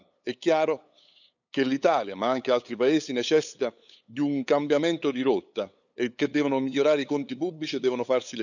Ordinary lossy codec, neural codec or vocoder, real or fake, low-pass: none; codec, 16 kHz, 8 kbps, FunCodec, trained on LibriTTS, 25 frames a second; fake; 7.2 kHz